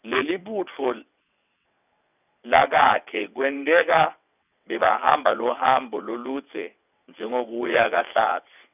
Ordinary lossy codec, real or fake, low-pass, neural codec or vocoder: none; fake; 3.6 kHz; vocoder, 22.05 kHz, 80 mel bands, WaveNeXt